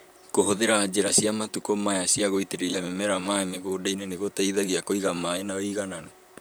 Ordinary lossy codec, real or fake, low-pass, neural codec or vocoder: none; fake; none; vocoder, 44.1 kHz, 128 mel bands, Pupu-Vocoder